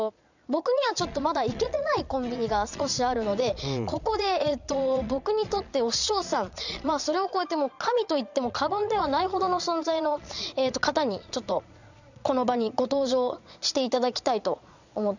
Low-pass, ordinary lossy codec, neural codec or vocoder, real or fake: 7.2 kHz; none; vocoder, 22.05 kHz, 80 mel bands, Vocos; fake